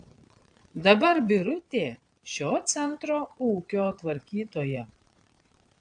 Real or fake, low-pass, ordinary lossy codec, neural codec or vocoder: fake; 9.9 kHz; AAC, 64 kbps; vocoder, 22.05 kHz, 80 mel bands, Vocos